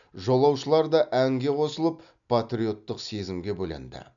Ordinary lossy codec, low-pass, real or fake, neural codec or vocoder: none; 7.2 kHz; real; none